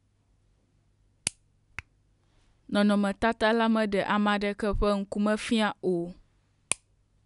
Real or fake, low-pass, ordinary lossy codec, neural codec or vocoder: real; 10.8 kHz; none; none